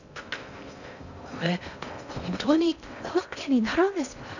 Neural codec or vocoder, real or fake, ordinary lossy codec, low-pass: codec, 16 kHz in and 24 kHz out, 0.6 kbps, FocalCodec, streaming, 2048 codes; fake; none; 7.2 kHz